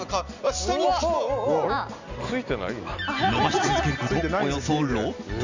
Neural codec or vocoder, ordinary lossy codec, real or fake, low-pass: none; Opus, 64 kbps; real; 7.2 kHz